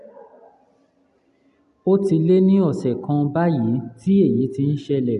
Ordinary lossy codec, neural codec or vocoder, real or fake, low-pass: none; none; real; 10.8 kHz